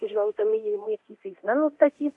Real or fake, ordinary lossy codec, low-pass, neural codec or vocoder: fake; MP3, 64 kbps; 10.8 kHz; codec, 24 kHz, 0.9 kbps, DualCodec